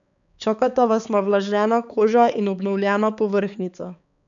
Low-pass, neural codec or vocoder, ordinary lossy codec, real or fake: 7.2 kHz; codec, 16 kHz, 4 kbps, X-Codec, HuBERT features, trained on balanced general audio; none; fake